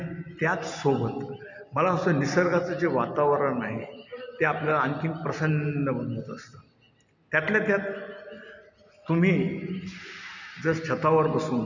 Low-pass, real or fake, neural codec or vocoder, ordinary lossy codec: 7.2 kHz; real; none; none